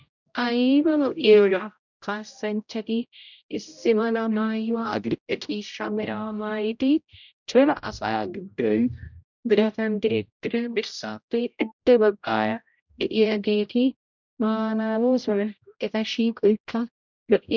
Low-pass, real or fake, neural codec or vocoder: 7.2 kHz; fake; codec, 16 kHz, 0.5 kbps, X-Codec, HuBERT features, trained on general audio